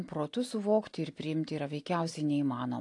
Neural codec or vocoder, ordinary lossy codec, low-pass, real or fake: none; AAC, 48 kbps; 10.8 kHz; real